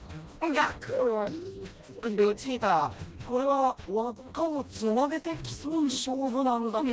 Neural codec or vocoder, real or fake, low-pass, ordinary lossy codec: codec, 16 kHz, 1 kbps, FreqCodec, smaller model; fake; none; none